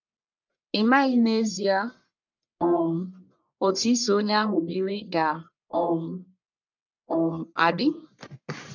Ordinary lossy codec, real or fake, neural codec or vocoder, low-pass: none; fake; codec, 44.1 kHz, 1.7 kbps, Pupu-Codec; 7.2 kHz